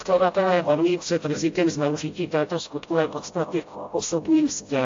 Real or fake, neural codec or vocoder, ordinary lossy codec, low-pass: fake; codec, 16 kHz, 0.5 kbps, FreqCodec, smaller model; AAC, 48 kbps; 7.2 kHz